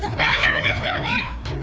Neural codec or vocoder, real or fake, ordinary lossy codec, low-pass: codec, 16 kHz, 2 kbps, FreqCodec, larger model; fake; none; none